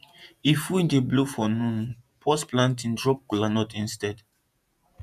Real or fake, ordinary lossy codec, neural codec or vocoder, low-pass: fake; none; vocoder, 48 kHz, 128 mel bands, Vocos; 14.4 kHz